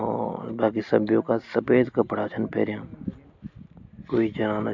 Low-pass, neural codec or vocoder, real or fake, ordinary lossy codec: 7.2 kHz; none; real; AAC, 48 kbps